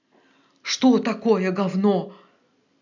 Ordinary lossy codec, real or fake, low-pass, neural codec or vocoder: none; real; 7.2 kHz; none